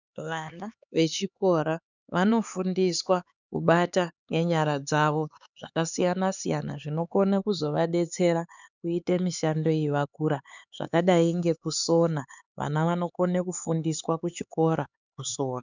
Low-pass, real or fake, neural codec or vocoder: 7.2 kHz; fake; codec, 16 kHz, 4 kbps, X-Codec, HuBERT features, trained on LibriSpeech